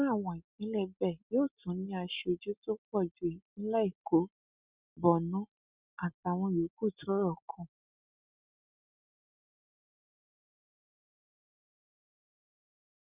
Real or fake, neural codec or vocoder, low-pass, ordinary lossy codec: real; none; 3.6 kHz; Opus, 64 kbps